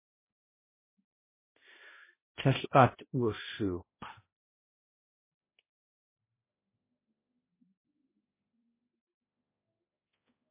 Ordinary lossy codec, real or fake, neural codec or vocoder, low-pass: MP3, 16 kbps; fake; codec, 16 kHz, 0.5 kbps, X-Codec, HuBERT features, trained on balanced general audio; 3.6 kHz